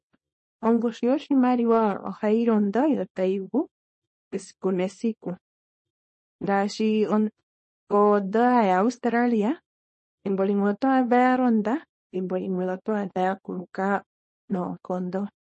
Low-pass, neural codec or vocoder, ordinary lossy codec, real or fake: 10.8 kHz; codec, 24 kHz, 0.9 kbps, WavTokenizer, small release; MP3, 32 kbps; fake